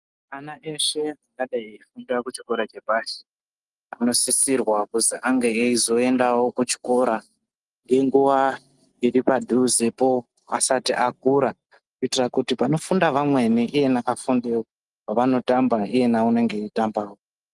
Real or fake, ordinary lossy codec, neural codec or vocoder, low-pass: real; Opus, 24 kbps; none; 10.8 kHz